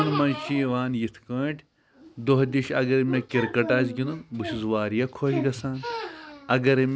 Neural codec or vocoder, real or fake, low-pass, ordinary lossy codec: none; real; none; none